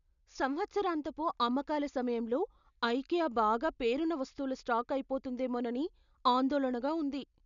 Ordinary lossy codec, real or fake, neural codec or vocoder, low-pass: none; real; none; 7.2 kHz